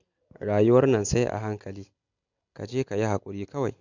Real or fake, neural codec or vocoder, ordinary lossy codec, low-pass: real; none; none; 7.2 kHz